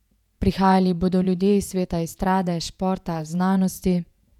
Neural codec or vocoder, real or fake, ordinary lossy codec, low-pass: vocoder, 48 kHz, 128 mel bands, Vocos; fake; none; 19.8 kHz